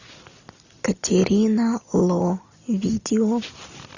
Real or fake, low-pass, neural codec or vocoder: real; 7.2 kHz; none